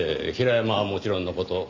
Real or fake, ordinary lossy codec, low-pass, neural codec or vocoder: real; MP3, 64 kbps; 7.2 kHz; none